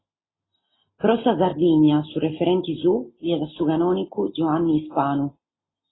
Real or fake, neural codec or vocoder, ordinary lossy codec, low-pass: real; none; AAC, 16 kbps; 7.2 kHz